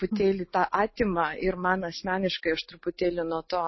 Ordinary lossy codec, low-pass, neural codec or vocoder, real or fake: MP3, 24 kbps; 7.2 kHz; none; real